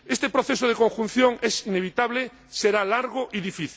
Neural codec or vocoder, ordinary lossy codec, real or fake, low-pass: none; none; real; none